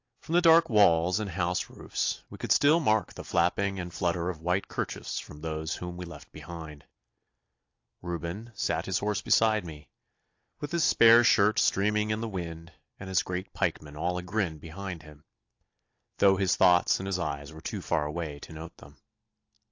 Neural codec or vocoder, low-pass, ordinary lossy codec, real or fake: none; 7.2 kHz; AAC, 48 kbps; real